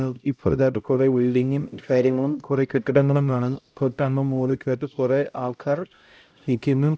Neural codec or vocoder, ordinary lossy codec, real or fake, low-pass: codec, 16 kHz, 0.5 kbps, X-Codec, HuBERT features, trained on LibriSpeech; none; fake; none